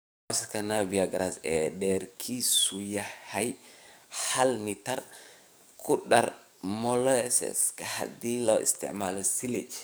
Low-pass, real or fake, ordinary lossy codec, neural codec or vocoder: none; fake; none; codec, 44.1 kHz, 7.8 kbps, DAC